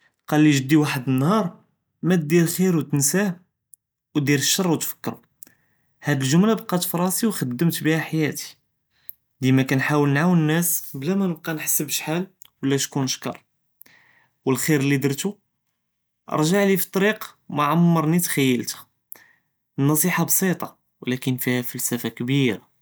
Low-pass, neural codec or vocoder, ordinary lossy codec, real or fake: none; none; none; real